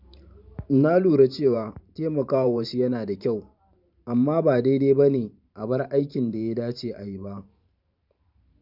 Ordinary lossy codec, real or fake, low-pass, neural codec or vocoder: none; fake; 5.4 kHz; vocoder, 44.1 kHz, 128 mel bands every 512 samples, BigVGAN v2